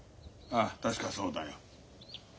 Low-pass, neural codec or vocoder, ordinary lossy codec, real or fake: none; none; none; real